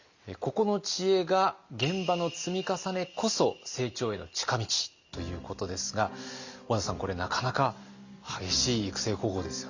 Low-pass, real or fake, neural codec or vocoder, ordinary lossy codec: 7.2 kHz; real; none; Opus, 64 kbps